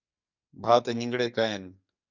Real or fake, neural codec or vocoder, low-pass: fake; codec, 44.1 kHz, 2.6 kbps, SNAC; 7.2 kHz